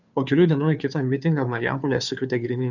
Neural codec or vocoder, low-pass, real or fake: codec, 16 kHz, 2 kbps, FunCodec, trained on Chinese and English, 25 frames a second; 7.2 kHz; fake